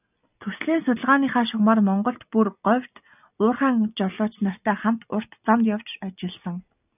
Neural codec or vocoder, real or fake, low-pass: none; real; 3.6 kHz